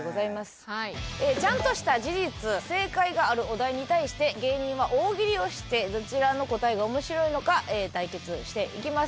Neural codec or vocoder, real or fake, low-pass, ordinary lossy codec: none; real; none; none